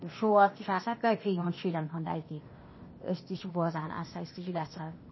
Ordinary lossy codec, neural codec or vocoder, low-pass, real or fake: MP3, 24 kbps; codec, 16 kHz, 0.8 kbps, ZipCodec; 7.2 kHz; fake